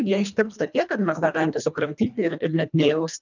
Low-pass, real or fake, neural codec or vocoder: 7.2 kHz; fake; codec, 24 kHz, 1.5 kbps, HILCodec